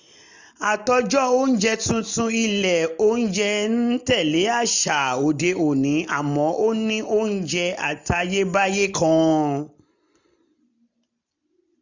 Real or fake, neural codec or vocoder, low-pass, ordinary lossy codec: real; none; 7.2 kHz; none